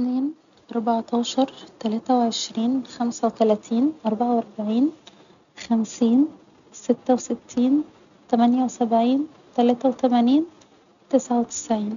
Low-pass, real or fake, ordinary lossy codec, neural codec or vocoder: 7.2 kHz; real; none; none